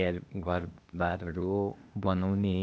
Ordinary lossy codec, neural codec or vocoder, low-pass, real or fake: none; codec, 16 kHz, 0.8 kbps, ZipCodec; none; fake